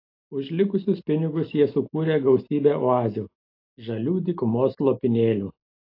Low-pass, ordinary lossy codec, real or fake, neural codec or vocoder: 5.4 kHz; AAC, 32 kbps; real; none